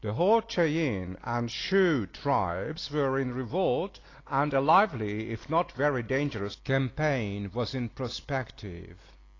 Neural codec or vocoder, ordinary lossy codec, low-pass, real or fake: none; AAC, 32 kbps; 7.2 kHz; real